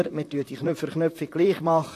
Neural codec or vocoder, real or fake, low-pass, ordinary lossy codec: vocoder, 44.1 kHz, 128 mel bands, Pupu-Vocoder; fake; 14.4 kHz; AAC, 64 kbps